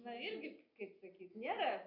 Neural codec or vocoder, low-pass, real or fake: none; 5.4 kHz; real